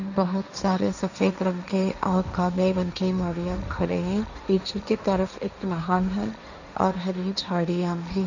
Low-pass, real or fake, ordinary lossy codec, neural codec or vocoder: 7.2 kHz; fake; none; codec, 16 kHz, 1.1 kbps, Voila-Tokenizer